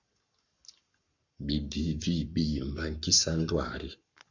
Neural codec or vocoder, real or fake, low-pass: codec, 44.1 kHz, 7.8 kbps, Pupu-Codec; fake; 7.2 kHz